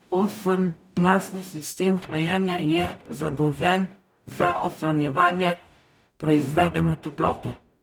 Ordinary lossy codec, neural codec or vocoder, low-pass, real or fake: none; codec, 44.1 kHz, 0.9 kbps, DAC; none; fake